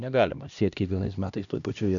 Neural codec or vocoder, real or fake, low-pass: codec, 16 kHz, 1 kbps, X-Codec, HuBERT features, trained on LibriSpeech; fake; 7.2 kHz